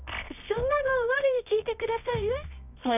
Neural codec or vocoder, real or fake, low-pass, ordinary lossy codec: codec, 24 kHz, 0.9 kbps, WavTokenizer, medium music audio release; fake; 3.6 kHz; none